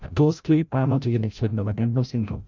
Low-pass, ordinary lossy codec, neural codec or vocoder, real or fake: 7.2 kHz; MP3, 64 kbps; codec, 16 kHz, 0.5 kbps, FreqCodec, larger model; fake